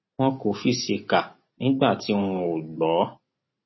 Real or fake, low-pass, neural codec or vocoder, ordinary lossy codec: real; 7.2 kHz; none; MP3, 24 kbps